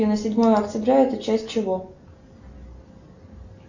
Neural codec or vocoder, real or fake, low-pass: none; real; 7.2 kHz